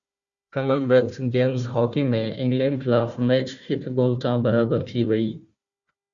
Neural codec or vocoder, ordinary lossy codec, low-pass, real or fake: codec, 16 kHz, 1 kbps, FunCodec, trained on Chinese and English, 50 frames a second; Opus, 64 kbps; 7.2 kHz; fake